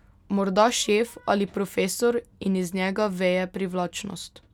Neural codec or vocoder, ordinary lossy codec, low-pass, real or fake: none; none; 19.8 kHz; real